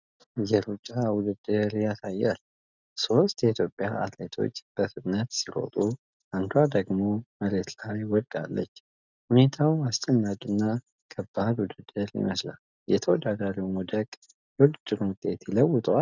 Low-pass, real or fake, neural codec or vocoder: 7.2 kHz; real; none